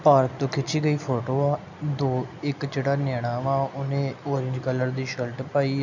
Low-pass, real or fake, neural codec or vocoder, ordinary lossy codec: 7.2 kHz; real; none; none